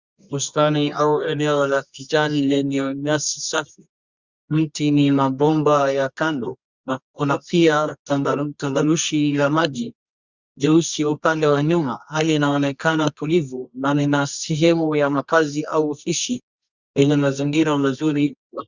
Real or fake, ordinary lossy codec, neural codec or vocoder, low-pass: fake; Opus, 64 kbps; codec, 24 kHz, 0.9 kbps, WavTokenizer, medium music audio release; 7.2 kHz